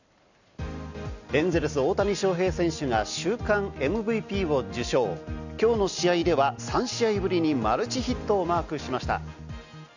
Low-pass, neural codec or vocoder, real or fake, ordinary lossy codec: 7.2 kHz; none; real; none